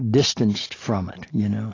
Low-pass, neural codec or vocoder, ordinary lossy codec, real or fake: 7.2 kHz; none; AAC, 32 kbps; real